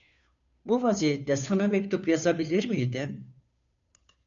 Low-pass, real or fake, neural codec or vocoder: 7.2 kHz; fake; codec, 16 kHz, 2 kbps, FunCodec, trained on Chinese and English, 25 frames a second